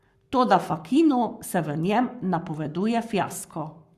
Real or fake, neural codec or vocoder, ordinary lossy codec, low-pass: fake; codec, 44.1 kHz, 7.8 kbps, Pupu-Codec; Opus, 64 kbps; 14.4 kHz